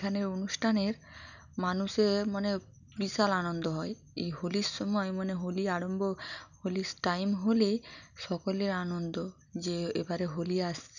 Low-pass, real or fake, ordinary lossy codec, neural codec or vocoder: 7.2 kHz; real; none; none